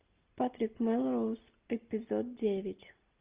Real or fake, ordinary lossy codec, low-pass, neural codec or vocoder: real; Opus, 24 kbps; 3.6 kHz; none